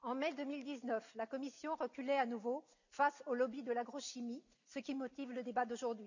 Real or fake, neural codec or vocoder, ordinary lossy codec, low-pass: real; none; none; 7.2 kHz